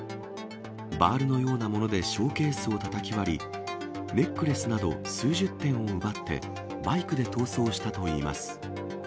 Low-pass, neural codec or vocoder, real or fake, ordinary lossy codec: none; none; real; none